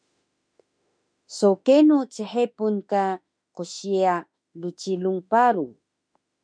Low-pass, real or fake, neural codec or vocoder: 9.9 kHz; fake; autoencoder, 48 kHz, 32 numbers a frame, DAC-VAE, trained on Japanese speech